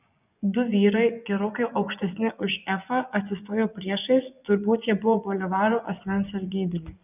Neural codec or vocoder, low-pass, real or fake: vocoder, 24 kHz, 100 mel bands, Vocos; 3.6 kHz; fake